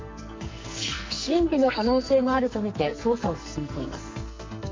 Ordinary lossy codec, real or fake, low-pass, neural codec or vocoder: MP3, 48 kbps; fake; 7.2 kHz; codec, 44.1 kHz, 2.6 kbps, SNAC